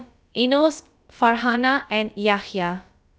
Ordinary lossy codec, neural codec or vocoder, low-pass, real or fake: none; codec, 16 kHz, about 1 kbps, DyCAST, with the encoder's durations; none; fake